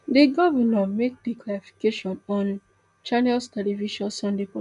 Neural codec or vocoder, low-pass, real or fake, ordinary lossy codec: none; 10.8 kHz; real; AAC, 96 kbps